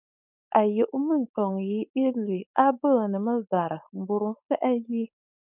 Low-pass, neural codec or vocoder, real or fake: 3.6 kHz; codec, 16 kHz, 4.8 kbps, FACodec; fake